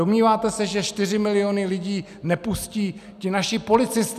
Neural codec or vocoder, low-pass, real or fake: none; 14.4 kHz; real